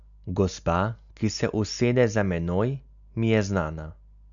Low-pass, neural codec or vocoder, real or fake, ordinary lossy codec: 7.2 kHz; none; real; none